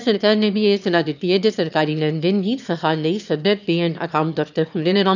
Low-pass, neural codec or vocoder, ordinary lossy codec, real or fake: 7.2 kHz; autoencoder, 22.05 kHz, a latent of 192 numbers a frame, VITS, trained on one speaker; none; fake